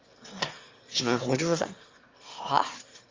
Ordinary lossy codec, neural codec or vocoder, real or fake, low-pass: Opus, 32 kbps; autoencoder, 22.05 kHz, a latent of 192 numbers a frame, VITS, trained on one speaker; fake; 7.2 kHz